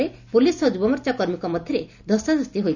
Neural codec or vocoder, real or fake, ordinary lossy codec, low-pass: none; real; none; 7.2 kHz